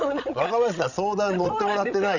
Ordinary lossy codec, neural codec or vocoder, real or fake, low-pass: none; codec, 16 kHz, 16 kbps, FreqCodec, larger model; fake; 7.2 kHz